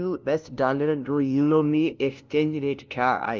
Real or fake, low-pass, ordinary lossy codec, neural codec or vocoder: fake; 7.2 kHz; Opus, 32 kbps; codec, 16 kHz, 0.5 kbps, FunCodec, trained on LibriTTS, 25 frames a second